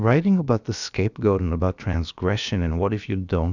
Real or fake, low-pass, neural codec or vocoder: fake; 7.2 kHz; codec, 16 kHz, about 1 kbps, DyCAST, with the encoder's durations